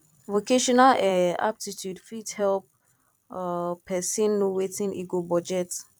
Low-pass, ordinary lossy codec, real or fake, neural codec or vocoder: 19.8 kHz; none; fake; vocoder, 44.1 kHz, 128 mel bands every 256 samples, BigVGAN v2